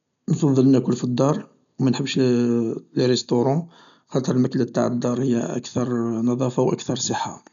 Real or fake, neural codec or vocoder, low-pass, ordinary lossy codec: real; none; 7.2 kHz; none